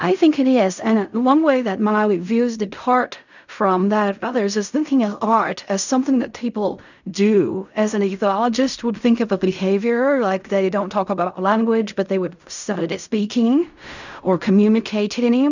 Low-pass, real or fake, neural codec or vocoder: 7.2 kHz; fake; codec, 16 kHz in and 24 kHz out, 0.4 kbps, LongCat-Audio-Codec, fine tuned four codebook decoder